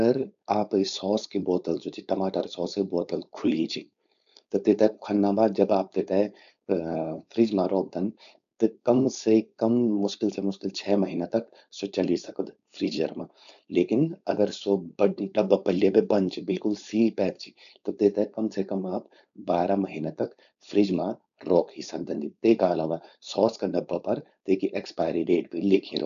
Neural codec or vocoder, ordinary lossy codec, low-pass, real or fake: codec, 16 kHz, 4.8 kbps, FACodec; MP3, 96 kbps; 7.2 kHz; fake